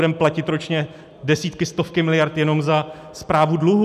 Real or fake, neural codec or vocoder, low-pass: real; none; 14.4 kHz